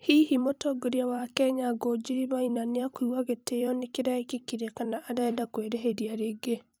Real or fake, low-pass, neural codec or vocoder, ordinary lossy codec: fake; none; vocoder, 44.1 kHz, 128 mel bands every 256 samples, BigVGAN v2; none